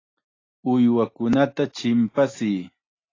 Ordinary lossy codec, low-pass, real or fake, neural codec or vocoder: AAC, 32 kbps; 7.2 kHz; real; none